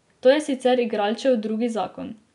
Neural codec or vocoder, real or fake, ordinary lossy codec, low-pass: none; real; none; 10.8 kHz